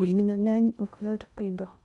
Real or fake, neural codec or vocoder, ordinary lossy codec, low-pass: fake; codec, 16 kHz in and 24 kHz out, 0.6 kbps, FocalCodec, streaming, 2048 codes; none; 10.8 kHz